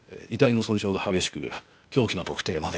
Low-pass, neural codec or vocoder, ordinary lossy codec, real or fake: none; codec, 16 kHz, 0.8 kbps, ZipCodec; none; fake